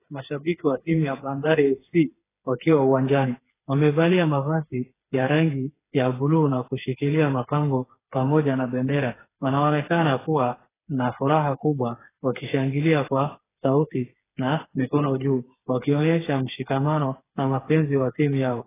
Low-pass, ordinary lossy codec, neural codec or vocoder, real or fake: 3.6 kHz; AAC, 16 kbps; codec, 16 kHz, 4 kbps, FreqCodec, smaller model; fake